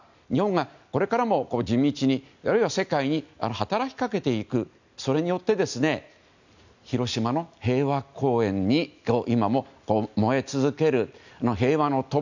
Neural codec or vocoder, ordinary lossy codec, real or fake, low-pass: none; none; real; 7.2 kHz